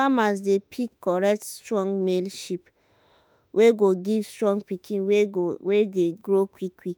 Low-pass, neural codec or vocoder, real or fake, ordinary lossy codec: none; autoencoder, 48 kHz, 32 numbers a frame, DAC-VAE, trained on Japanese speech; fake; none